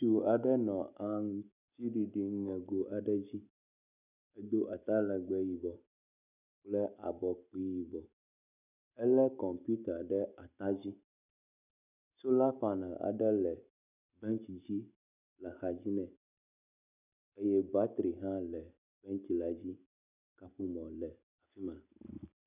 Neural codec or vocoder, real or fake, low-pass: none; real; 3.6 kHz